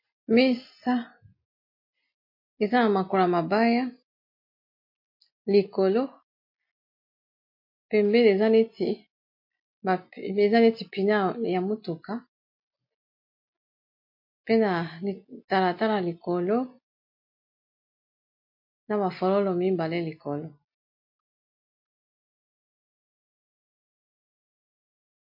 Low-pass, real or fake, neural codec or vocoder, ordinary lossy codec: 5.4 kHz; real; none; MP3, 32 kbps